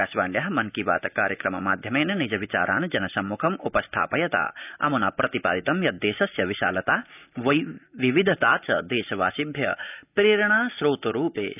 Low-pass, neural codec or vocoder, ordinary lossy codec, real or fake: 3.6 kHz; none; none; real